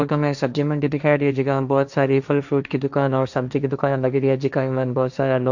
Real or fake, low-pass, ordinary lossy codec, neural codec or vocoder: fake; 7.2 kHz; none; codec, 16 kHz, 1.1 kbps, Voila-Tokenizer